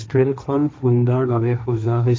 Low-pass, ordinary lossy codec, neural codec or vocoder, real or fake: none; none; codec, 16 kHz, 1.1 kbps, Voila-Tokenizer; fake